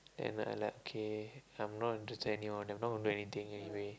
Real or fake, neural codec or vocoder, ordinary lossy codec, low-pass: real; none; none; none